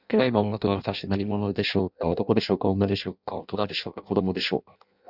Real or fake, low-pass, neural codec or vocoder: fake; 5.4 kHz; codec, 16 kHz in and 24 kHz out, 0.6 kbps, FireRedTTS-2 codec